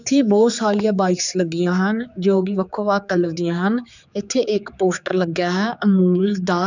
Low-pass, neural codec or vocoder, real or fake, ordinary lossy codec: 7.2 kHz; codec, 16 kHz, 4 kbps, X-Codec, HuBERT features, trained on general audio; fake; none